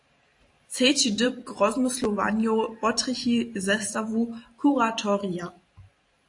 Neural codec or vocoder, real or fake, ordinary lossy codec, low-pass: vocoder, 44.1 kHz, 128 mel bands every 512 samples, BigVGAN v2; fake; AAC, 48 kbps; 10.8 kHz